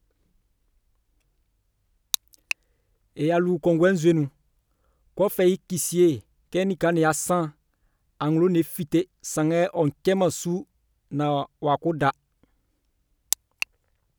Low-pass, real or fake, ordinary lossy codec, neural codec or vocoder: none; real; none; none